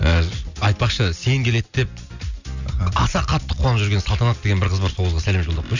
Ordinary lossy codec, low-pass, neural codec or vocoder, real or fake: none; 7.2 kHz; none; real